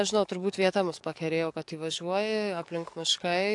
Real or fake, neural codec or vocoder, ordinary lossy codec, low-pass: fake; codec, 44.1 kHz, 7.8 kbps, DAC; AAC, 64 kbps; 10.8 kHz